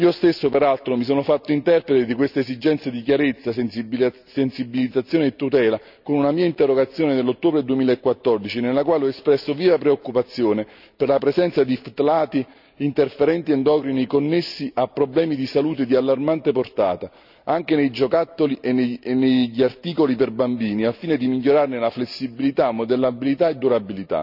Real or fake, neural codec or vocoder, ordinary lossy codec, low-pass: real; none; none; 5.4 kHz